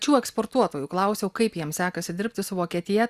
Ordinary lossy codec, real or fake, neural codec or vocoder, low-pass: Opus, 64 kbps; real; none; 14.4 kHz